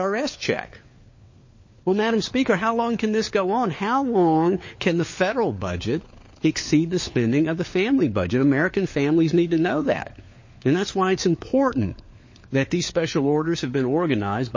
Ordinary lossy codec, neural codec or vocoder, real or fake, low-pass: MP3, 32 kbps; codec, 16 kHz, 4 kbps, FunCodec, trained on LibriTTS, 50 frames a second; fake; 7.2 kHz